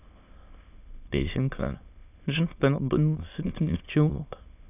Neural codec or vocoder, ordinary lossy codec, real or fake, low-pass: autoencoder, 22.05 kHz, a latent of 192 numbers a frame, VITS, trained on many speakers; none; fake; 3.6 kHz